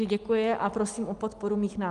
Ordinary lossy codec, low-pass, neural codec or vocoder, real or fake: Opus, 32 kbps; 10.8 kHz; none; real